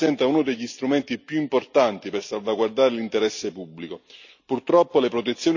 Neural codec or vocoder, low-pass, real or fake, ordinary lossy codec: none; 7.2 kHz; real; none